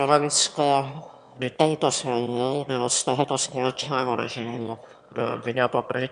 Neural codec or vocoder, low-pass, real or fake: autoencoder, 22.05 kHz, a latent of 192 numbers a frame, VITS, trained on one speaker; 9.9 kHz; fake